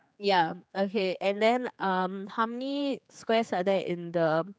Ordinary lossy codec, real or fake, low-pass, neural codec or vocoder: none; fake; none; codec, 16 kHz, 4 kbps, X-Codec, HuBERT features, trained on general audio